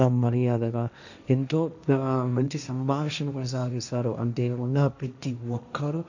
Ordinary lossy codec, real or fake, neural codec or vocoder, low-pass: none; fake; codec, 16 kHz, 1.1 kbps, Voila-Tokenizer; 7.2 kHz